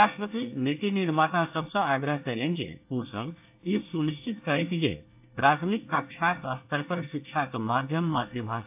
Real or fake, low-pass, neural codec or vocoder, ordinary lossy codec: fake; 3.6 kHz; codec, 24 kHz, 1 kbps, SNAC; AAC, 32 kbps